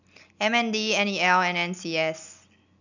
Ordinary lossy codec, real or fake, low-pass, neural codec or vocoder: none; real; 7.2 kHz; none